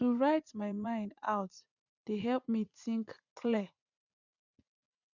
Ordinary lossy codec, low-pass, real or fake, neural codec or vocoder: none; 7.2 kHz; fake; vocoder, 44.1 kHz, 80 mel bands, Vocos